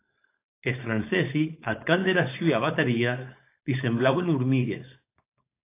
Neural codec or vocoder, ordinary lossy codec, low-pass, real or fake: codec, 16 kHz, 4.8 kbps, FACodec; AAC, 24 kbps; 3.6 kHz; fake